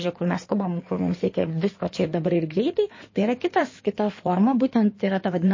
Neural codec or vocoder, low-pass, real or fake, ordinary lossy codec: codec, 16 kHz in and 24 kHz out, 2.2 kbps, FireRedTTS-2 codec; 7.2 kHz; fake; MP3, 32 kbps